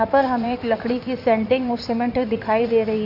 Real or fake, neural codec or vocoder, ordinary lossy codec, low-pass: fake; codec, 16 kHz in and 24 kHz out, 2.2 kbps, FireRedTTS-2 codec; none; 5.4 kHz